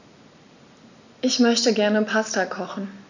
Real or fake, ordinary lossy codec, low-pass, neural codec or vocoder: real; none; 7.2 kHz; none